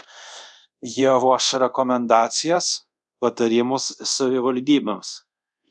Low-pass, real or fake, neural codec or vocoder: 10.8 kHz; fake; codec, 24 kHz, 0.5 kbps, DualCodec